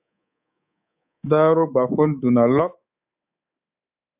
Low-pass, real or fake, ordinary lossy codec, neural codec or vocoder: 3.6 kHz; fake; AAC, 32 kbps; codec, 24 kHz, 3.1 kbps, DualCodec